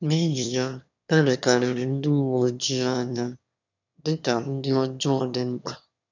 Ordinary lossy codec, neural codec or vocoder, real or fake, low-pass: none; autoencoder, 22.05 kHz, a latent of 192 numbers a frame, VITS, trained on one speaker; fake; 7.2 kHz